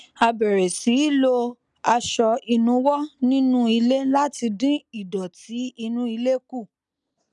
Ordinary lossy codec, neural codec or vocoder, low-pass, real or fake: none; none; 10.8 kHz; real